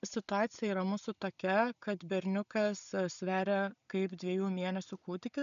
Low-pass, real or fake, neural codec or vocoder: 7.2 kHz; real; none